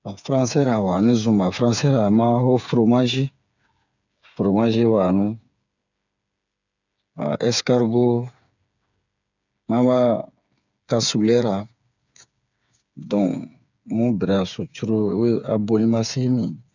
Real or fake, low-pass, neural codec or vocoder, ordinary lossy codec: fake; 7.2 kHz; codec, 16 kHz, 8 kbps, FreqCodec, smaller model; none